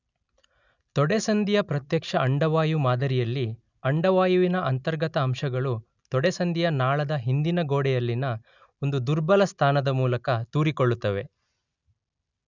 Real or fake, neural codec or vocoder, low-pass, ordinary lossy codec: real; none; 7.2 kHz; none